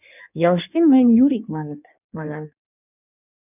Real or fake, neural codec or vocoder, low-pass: fake; codec, 16 kHz in and 24 kHz out, 1.1 kbps, FireRedTTS-2 codec; 3.6 kHz